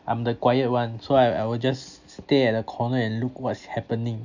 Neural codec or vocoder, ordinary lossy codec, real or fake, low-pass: none; none; real; 7.2 kHz